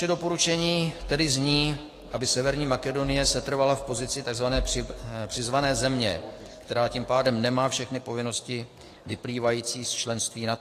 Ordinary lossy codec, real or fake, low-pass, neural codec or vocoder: AAC, 48 kbps; fake; 14.4 kHz; codec, 44.1 kHz, 7.8 kbps, DAC